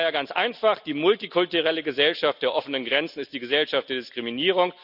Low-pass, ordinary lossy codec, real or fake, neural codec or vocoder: 5.4 kHz; none; real; none